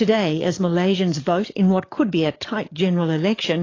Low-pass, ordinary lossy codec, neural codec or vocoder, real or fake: 7.2 kHz; AAC, 32 kbps; codec, 44.1 kHz, 7.8 kbps, DAC; fake